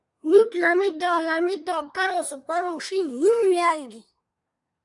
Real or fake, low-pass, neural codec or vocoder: fake; 10.8 kHz; codec, 24 kHz, 1 kbps, SNAC